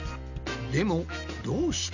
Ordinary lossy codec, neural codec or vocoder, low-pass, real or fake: none; none; 7.2 kHz; real